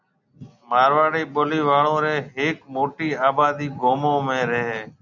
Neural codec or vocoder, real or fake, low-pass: none; real; 7.2 kHz